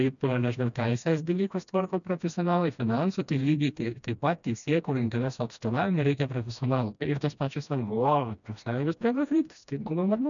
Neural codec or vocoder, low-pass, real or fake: codec, 16 kHz, 1 kbps, FreqCodec, smaller model; 7.2 kHz; fake